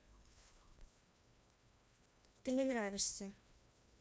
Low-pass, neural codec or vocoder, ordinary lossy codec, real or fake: none; codec, 16 kHz, 1 kbps, FreqCodec, larger model; none; fake